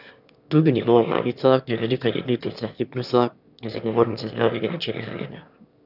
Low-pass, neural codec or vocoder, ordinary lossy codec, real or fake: 5.4 kHz; autoencoder, 22.05 kHz, a latent of 192 numbers a frame, VITS, trained on one speaker; none; fake